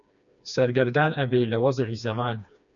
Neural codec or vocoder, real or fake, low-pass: codec, 16 kHz, 2 kbps, FreqCodec, smaller model; fake; 7.2 kHz